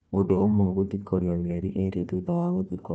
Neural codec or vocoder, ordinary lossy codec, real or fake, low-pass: codec, 16 kHz, 1 kbps, FunCodec, trained on Chinese and English, 50 frames a second; none; fake; none